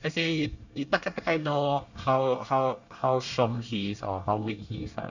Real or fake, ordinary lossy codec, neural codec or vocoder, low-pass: fake; none; codec, 24 kHz, 1 kbps, SNAC; 7.2 kHz